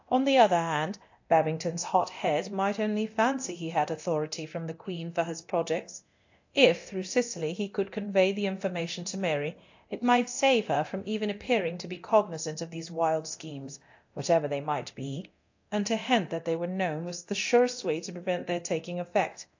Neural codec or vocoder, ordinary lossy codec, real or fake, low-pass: codec, 24 kHz, 0.9 kbps, DualCodec; AAC, 48 kbps; fake; 7.2 kHz